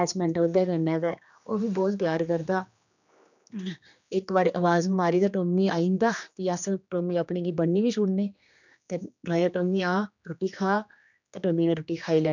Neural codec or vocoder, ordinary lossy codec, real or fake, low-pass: codec, 16 kHz, 2 kbps, X-Codec, HuBERT features, trained on general audio; none; fake; 7.2 kHz